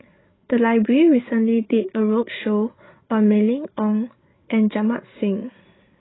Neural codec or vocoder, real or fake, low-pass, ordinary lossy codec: codec, 16 kHz, 8 kbps, FreqCodec, larger model; fake; 7.2 kHz; AAC, 16 kbps